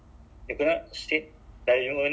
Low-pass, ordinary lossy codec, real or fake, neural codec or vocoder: none; none; real; none